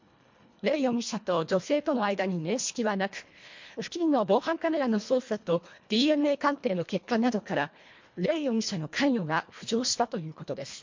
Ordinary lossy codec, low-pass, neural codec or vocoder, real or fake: MP3, 48 kbps; 7.2 kHz; codec, 24 kHz, 1.5 kbps, HILCodec; fake